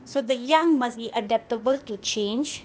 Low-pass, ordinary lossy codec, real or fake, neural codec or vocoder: none; none; fake; codec, 16 kHz, 0.8 kbps, ZipCodec